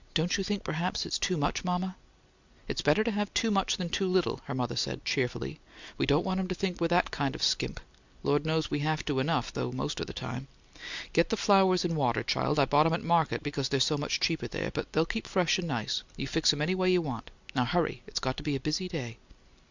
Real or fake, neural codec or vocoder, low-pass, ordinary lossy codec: real; none; 7.2 kHz; Opus, 64 kbps